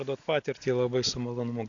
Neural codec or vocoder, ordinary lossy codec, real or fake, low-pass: none; AAC, 48 kbps; real; 7.2 kHz